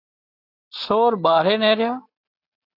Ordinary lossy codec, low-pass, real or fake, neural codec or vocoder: AAC, 32 kbps; 5.4 kHz; real; none